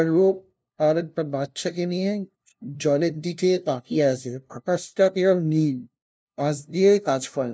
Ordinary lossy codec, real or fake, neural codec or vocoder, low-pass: none; fake; codec, 16 kHz, 0.5 kbps, FunCodec, trained on LibriTTS, 25 frames a second; none